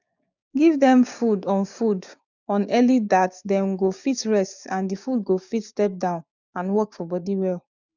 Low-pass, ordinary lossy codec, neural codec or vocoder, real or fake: 7.2 kHz; none; codec, 16 kHz, 6 kbps, DAC; fake